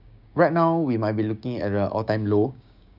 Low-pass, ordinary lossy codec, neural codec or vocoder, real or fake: 5.4 kHz; none; codec, 16 kHz, 6 kbps, DAC; fake